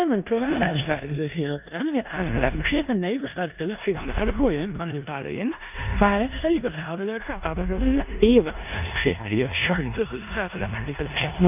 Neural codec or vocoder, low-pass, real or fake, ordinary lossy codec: codec, 16 kHz in and 24 kHz out, 0.9 kbps, LongCat-Audio-Codec, four codebook decoder; 3.6 kHz; fake; none